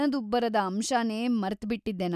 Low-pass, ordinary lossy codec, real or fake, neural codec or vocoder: 14.4 kHz; none; real; none